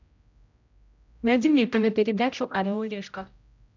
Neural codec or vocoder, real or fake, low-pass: codec, 16 kHz, 0.5 kbps, X-Codec, HuBERT features, trained on general audio; fake; 7.2 kHz